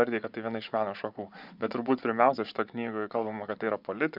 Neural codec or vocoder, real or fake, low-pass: vocoder, 24 kHz, 100 mel bands, Vocos; fake; 5.4 kHz